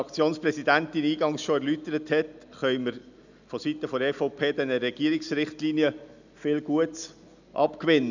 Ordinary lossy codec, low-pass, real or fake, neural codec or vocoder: none; 7.2 kHz; real; none